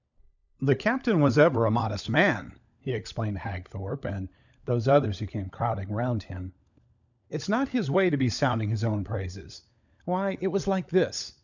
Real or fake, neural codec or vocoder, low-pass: fake; codec, 16 kHz, 16 kbps, FunCodec, trained on LibriTTS, 50 frames a second; 7.2 kHz